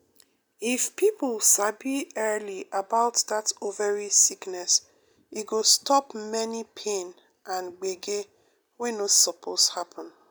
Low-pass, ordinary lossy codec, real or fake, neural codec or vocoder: none; none; real; none